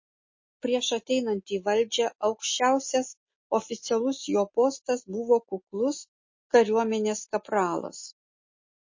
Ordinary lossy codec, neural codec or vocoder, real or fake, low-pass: MP3, 32 kbps; none; real; 7.2 kHz